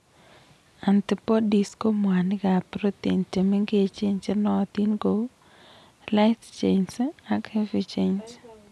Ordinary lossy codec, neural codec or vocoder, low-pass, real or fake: none; none; none; real